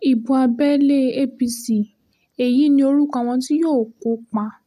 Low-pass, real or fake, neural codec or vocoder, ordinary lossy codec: 14.4 kHz; real; none; none